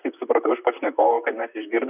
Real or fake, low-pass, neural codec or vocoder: fake; 3.6 kHz; vocoder, 44.1 kHz, 128 mel bands, Pupu-Vocoder